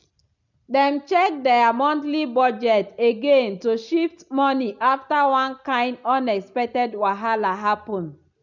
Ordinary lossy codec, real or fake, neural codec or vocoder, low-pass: none; real; none; 7.2 kHz